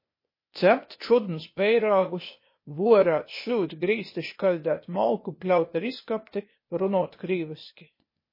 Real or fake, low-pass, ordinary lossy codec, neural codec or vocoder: fake; 5.4 kHz; MP3, 24 kbps; codec, 16 kHz, 0.8 kbps, ZipCodec